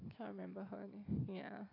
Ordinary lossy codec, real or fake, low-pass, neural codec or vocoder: AAC, 32 kbps; real; 5.4 kHz; none